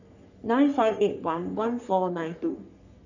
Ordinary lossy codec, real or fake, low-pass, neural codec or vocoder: none; fake; 7.2 kHz; codec, 44.1 kHz, 3.4 kbps, Pupu-Codec